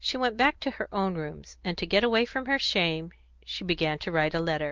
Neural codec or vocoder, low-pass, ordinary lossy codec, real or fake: none; 7.2 kHz; Opus, 32 kbps; real